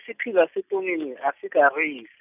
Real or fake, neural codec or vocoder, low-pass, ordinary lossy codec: real; none; 3.6 kHz; none